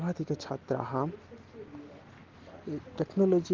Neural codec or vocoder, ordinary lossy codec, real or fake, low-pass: none; Opus, 16 kbps; real; 7.2 kHz